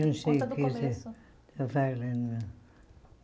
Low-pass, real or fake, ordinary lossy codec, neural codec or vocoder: none; real; none; none